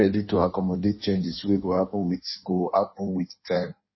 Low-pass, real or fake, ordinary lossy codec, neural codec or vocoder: 7.2 kHz; fake; MP3, 24 kbps; codec, 16 kHz in and 24 kHz out, 1.1 kbps, FireRedTTS-2 codec